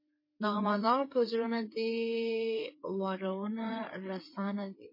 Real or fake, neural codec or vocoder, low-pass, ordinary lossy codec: fake; codec, 16 kHz, 4 kbps, FreqCodec, larger model; 5.4 kHz; MP3, 24 kbps